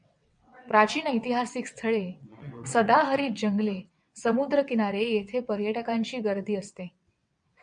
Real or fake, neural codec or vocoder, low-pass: fake; vocoder, 22.05 kHz, 80 mel bands, WaveNeXt; 9.9 kHz